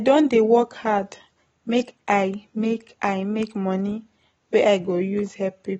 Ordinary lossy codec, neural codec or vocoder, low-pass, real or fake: AAC, 24 kbps; none; 19.8 kHz; real